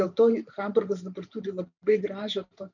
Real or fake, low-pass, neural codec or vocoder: fake; 7.2 kHz; vocoder, 44.1 kHz, 128 mel bands every 512 samples, BigVGAN v2